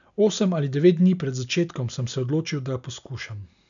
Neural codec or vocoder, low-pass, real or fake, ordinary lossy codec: none; 7.2 kHz; real; none